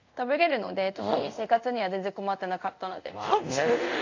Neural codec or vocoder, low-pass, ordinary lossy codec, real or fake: codec, 24 kHz, 0.5 kbps, DualCodec; 7.2 kHz; AAC, 48 kbps; fake